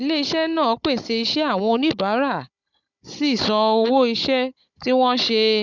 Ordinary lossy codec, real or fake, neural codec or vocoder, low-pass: none; fake; codec, 16 kHz, 16 kbps, FunCodec, trained on Chinese and English, 50 frames a second; 7.2 kHz